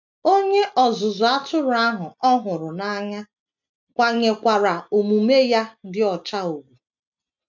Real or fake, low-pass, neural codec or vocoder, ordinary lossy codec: real; 7.2 kHz; none; none